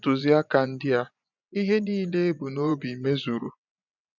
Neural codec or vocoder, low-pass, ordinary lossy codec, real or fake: vocoder, 24 kHz, 100 mel bands, Vocos; 7.2 kHz; none; fake